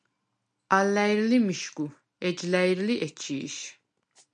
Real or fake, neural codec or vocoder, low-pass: real; none; 9.9 kHz